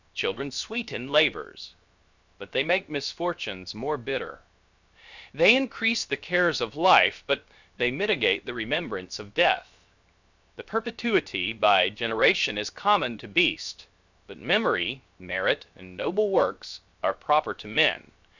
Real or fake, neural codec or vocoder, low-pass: fake; codec, 16 kHz, 0.7 kbps, FocalCodec; 7.2 kHz